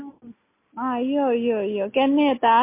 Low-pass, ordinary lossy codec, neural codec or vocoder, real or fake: 3.6 kHz; MP3, 24 kbps; none; real